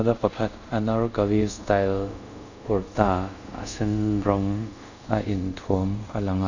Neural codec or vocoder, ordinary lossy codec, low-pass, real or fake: codec, 24 kHz, 0.5 kbps, DualCodec; none; 7.2 kHz; fake